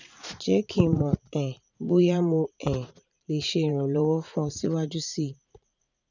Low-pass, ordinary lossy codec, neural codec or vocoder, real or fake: 7.2 kHz; none; vocoder, 44.1 kHz, 80 mel bands, Vocos; fake